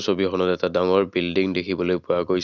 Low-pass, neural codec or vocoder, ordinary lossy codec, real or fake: 7.2 kHz; none; none; real